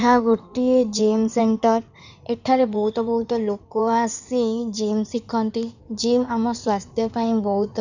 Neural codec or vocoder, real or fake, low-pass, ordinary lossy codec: codec, 16 kHz in and 24 kHz out, 2.2 kbps, FireRedTTS-2 codec; fake; 7.2 kHz; none